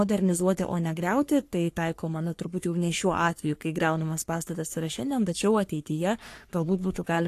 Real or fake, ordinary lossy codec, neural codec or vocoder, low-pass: fake; AAC, 64 kbps; codec, 44.1 kHz, 3.4 kbps, Pupu-Codec; 14.4 kHz